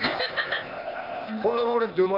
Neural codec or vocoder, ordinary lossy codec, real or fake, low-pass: codec, 16 kHz, 0.8 kbps, ZipCodec; none; fake; 5.4 kHz